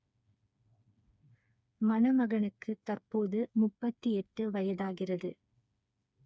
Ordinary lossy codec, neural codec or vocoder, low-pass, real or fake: none; codec, 16 kHz, 4 kbps, FreqCodec, smaller model; none; fake